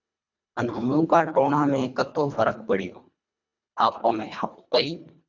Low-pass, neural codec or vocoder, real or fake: 7.2 kHz; codec, 24 kHz, 1.5 kbps, HILCodec; fake